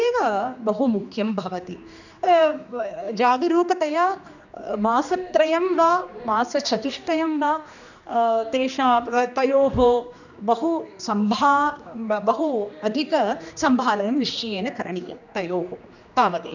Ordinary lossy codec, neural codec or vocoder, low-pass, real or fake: none; codec, 16 kHz, 2 kbps, X-Codec, HuBERT features, trained on general audio; 7.2 kHz; fake